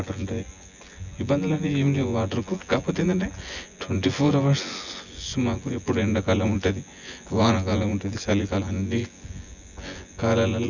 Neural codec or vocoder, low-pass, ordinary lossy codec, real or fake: vocoder, 24 kHz, 100 mel bands, Vocos; 7.2 kHz; none; fake